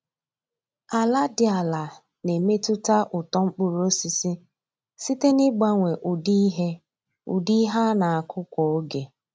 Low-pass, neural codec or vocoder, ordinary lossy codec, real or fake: none; none; none; real